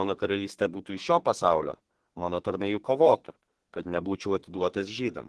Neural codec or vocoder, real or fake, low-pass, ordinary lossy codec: codec, 32 kHz, 1.9 kbps, SNAC; fake; 10.8 kHz; Opus, 16 kbps